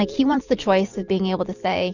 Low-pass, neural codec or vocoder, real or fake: 7.2 kHz; vocoder, 44.1 kHz, 128 mel bands every 256 samples, BigVGAN v2; fake